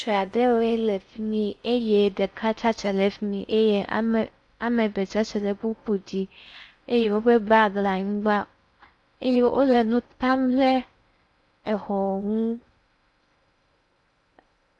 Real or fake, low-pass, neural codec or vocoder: fake; 10.8 kHz; codec, 16 kHz in and 24 kHz out, 0.6 kbps, FocalCodec, streaming, 4096 codes